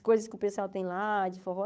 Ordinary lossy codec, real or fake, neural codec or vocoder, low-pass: none; fake; codec, 16 kHz, 8 kbps, FunCodec, trained on Chinese and English, 25 frames a second; none